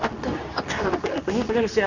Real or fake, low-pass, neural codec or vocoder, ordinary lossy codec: fake; 7.2 kHz; codec, 24 kHz, 0.9 kbps, WavTokenizer, medium speech release version 2; none